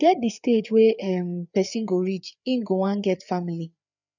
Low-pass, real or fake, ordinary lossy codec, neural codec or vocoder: 7.2 kHz; fake; none; codec, 16 kHz, 4 kbps, FreqCodec, larger model